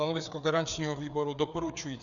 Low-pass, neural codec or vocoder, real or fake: 7.2 kHz; codec, 16 kHz, 4 kbps, FreqCodec, larger model; fake